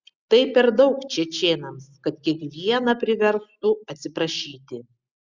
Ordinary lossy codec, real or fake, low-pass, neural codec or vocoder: Opus, 64 kbps; real; 7.2 kHz; none